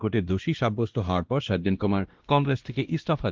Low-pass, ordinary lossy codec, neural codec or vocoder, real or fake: 7.2 kHz; Opus, 16 kbps; codec, 16 kHz, 1 kbps, X-Codec, WavLM features, trained on Multilingual LibriSpeech; fake